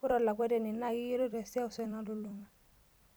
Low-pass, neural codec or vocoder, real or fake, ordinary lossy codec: none; vocoder, 44.1 kHz, 128 mel bands every 256 samples, BigVGAN v2; fake; none